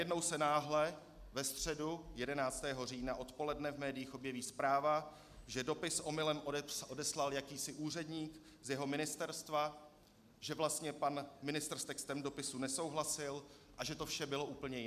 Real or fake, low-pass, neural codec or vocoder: real; 14.4 kHz; none